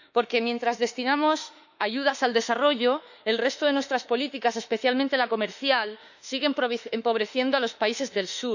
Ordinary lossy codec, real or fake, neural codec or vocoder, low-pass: none; fake; autoencoder, 48 kHz, 32 numbers a frame, DAC-VAE, trained on Japanese speech; 7.2 kHz